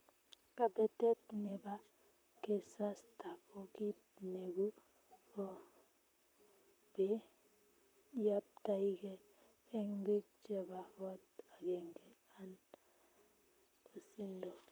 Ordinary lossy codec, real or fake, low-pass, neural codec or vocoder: none; fake; none; vocoder, 44.1 kHz, 128 mel bands, Pupu-Vocoder